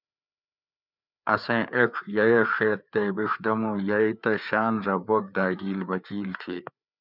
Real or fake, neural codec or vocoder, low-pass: fake; codec, 16 kHz, 4 kbps, FreqCodec, larger model; 5.4 kHz